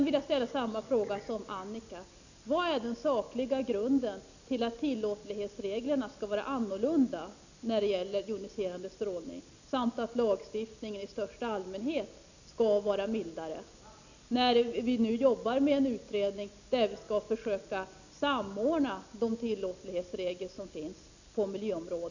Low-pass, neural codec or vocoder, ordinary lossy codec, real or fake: 7.2 kHz; none; none; real